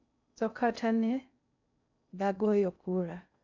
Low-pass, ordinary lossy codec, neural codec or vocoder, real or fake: 7.2 kHz; MP3, 64 kbps; codec, 16 kHz in and 24 kHz out, 0.6 kbps, FocalCodec, streaming, 2048 codes; fake